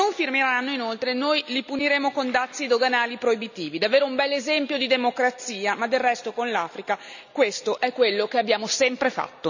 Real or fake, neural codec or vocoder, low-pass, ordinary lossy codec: real; none; 7.2 kHz; none